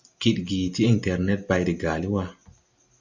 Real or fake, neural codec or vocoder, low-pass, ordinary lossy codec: real; none; 7.2 kHz; Opus, 64 kbps